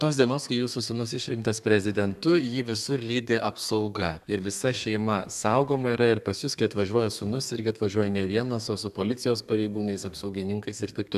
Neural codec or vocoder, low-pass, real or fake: codec, 32 kHz, 1.9 kbps, SNAC; 14.4 kHz; fake